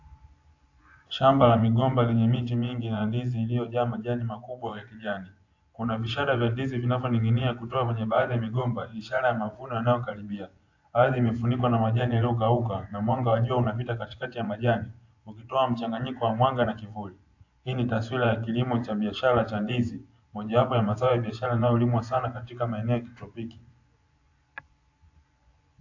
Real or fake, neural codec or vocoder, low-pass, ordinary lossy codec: fake; vocoder, 24 kHz, 100 mel bands, Vocos; 7.2 kHz; AAC, 48 kbps